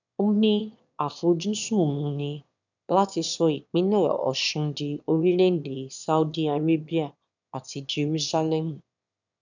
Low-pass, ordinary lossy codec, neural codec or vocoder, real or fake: 7.2 kHz; none; autoencoder, 22.05 kHz, a latent of 192 numbers a frame, VITS, trained on one speaker; fake